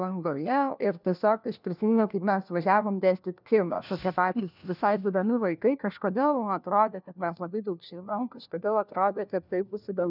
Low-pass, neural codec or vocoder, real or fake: 5.4 kHz; codec, 16 kHz, 1 kbps, FunCodec, trained on LibriTTS, 50 frames a second; fake